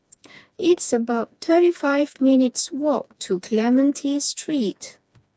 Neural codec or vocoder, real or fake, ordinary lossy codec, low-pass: codec, 16 kHz, 2 kbps, FreqCodec, smaller model; fake; none; none